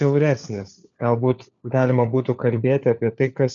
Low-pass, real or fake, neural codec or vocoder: 7.2 kHz; fake; codec, 16 kHz, 4 kbps, FunCodec, trained on LibriTTS, 50 frames a second